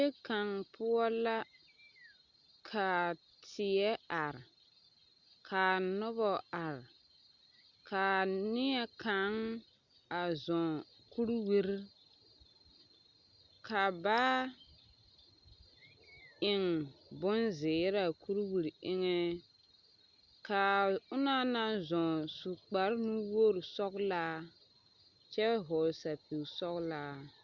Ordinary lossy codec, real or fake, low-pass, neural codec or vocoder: Opus, 64 kbps; real; 7.2 kHz; none